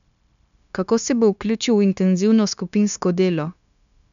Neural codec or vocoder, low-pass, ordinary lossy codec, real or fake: codec, 16 kHz, 0.9 kbps, LongCat-Audio-Codec; 7.2 kHz; none; fake